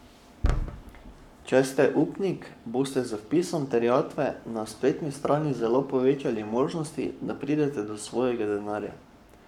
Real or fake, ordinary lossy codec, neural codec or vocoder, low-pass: fake; none; codec, 44.1 kHz, 7.8 kbps, Pupu-Codec; 19.8 kHz